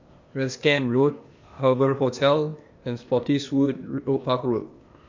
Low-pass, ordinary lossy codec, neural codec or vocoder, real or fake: 7.2 kHz; MP3, 48 kbps; codec, 16 kHz, 0.8 kbps, ZipCodec; fake